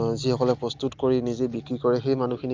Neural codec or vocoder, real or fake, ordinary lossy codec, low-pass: none; real; Opus, 32 kbps; 7.2 kHz